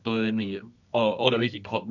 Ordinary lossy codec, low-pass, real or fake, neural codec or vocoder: none; 7.2 kHz; fake; codec, 24 kHz, 0.9 kbps, WavTokenizer, medium music audio release